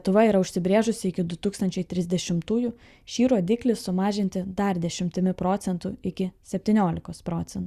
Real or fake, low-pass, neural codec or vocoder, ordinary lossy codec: real; 14.4 kHz; none; Opus, 64 kbps